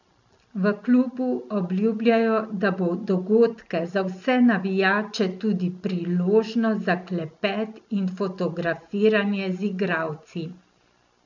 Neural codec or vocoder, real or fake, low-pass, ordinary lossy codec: none; real; 7.2 kHz; none